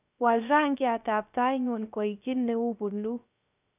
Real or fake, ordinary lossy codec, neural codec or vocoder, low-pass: fake; none; codec, 16 kHz, 0.3 kbps, FocalCodec; 3.6 kHz